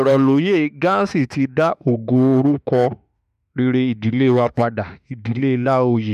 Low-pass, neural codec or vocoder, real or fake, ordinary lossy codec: 14.4 kHz; autoencoder, 48 kHz, 32 numbers a frame, DAC-VAE, trained on Japanese speech; fake; none